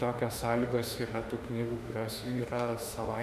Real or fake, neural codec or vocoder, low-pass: fake; autoencoder, 48 kHz, 32 numbers a frame, DAC-VAE, trained on Japanese speech; 14.4 kHz